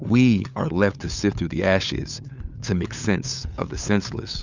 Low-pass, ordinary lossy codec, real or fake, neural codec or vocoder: 7.2 kHz; Opus, 64 kbps; fake; codec, 16 kHz, 8 kbps, FunCodec, trained on LibriTTS, 25 frames a second